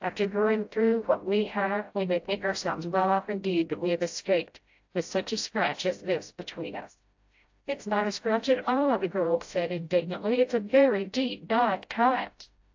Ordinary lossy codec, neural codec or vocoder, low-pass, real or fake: AAC, 48 kbps; codec, 16 kHz, 0.5 kbps, FreqCodec, smaller model; 7.2 kHz; fake